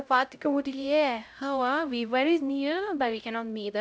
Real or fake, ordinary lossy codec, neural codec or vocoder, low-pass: fake; none; codec, 16 kHz, 0.5 kbps, X-Codec, HuBERT features, trained on LibriSpeech; none